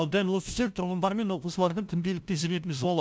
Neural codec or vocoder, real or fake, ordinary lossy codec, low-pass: codec, 16 kHz, 0.5 kbps, FunCodec, trained on LibriTTS, 25 frames a second; fake; none; none